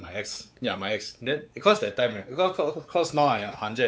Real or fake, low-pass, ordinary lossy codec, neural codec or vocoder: fake; none; none; codec, 16 kHz, 4 kbps, X-Codec, WavLM features, trained on Multilingual LibriSpeech